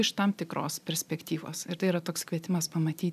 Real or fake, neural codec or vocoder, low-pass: fake; vocoder, 44.1 kHz, 128 mel bands every 256 samples, BigVGAN v2; 14.4 kHz